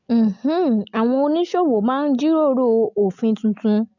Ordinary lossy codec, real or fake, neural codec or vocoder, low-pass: none; real; none; 7.2 kHz